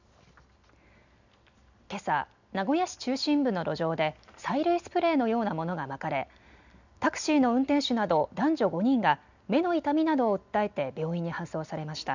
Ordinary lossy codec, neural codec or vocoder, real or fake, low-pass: none; none; real; 7.2 kHz